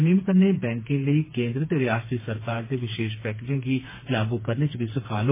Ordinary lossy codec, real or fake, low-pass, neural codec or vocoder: MP3, 16 kbps; fake; 3.6 kHz; codec, 16 kHz, 4 kbps, FreqCodec, smaller model